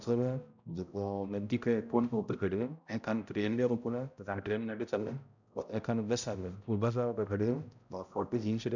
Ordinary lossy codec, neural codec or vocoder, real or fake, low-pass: none; codec, 16 kHz, 0.5 kbps, X-Codec, HuBERT features, trained on balanced general audio; fake; 7.2 kHz